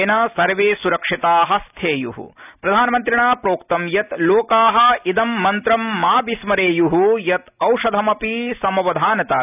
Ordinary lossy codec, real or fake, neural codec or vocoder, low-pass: none; real; none; 3.6 kHz